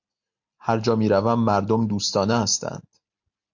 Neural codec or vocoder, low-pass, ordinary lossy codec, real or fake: none; 7.2 kHz; MP3, 48 kbps; real